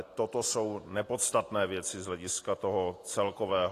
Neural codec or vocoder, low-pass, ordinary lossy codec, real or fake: none; 14.4 kHz; AAC, 48 kbps; real